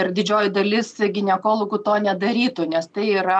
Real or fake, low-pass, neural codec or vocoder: real; 9.9 kHz; none